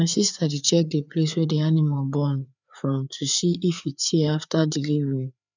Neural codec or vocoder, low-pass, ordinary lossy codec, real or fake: codec, 16 kHz, 4 kbps, FreqCodec, larger model; 7.2 kHz; none; fake